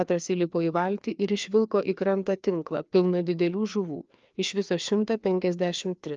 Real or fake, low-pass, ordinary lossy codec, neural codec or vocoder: fake; 7.2 kHz; Opus, 24 kbps; codec, 16 kHz, 2 kbps, FreqCodec, larger model